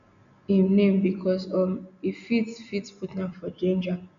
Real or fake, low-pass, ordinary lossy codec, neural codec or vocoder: real; 7.2 kHz; none; none